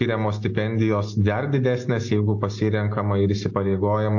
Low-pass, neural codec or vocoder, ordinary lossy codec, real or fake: 7.2 kHz; none; AAC, 48 kbps; real